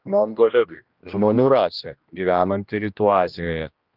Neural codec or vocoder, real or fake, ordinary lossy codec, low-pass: codec, 16 kHz, 1 kbps, X-Codec, HuBERT features, trained on general audio; fake; Opus, 32 kbps; 5.4 kHz